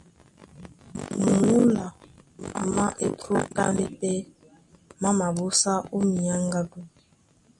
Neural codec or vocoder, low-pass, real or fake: none; 10.8 kHz; real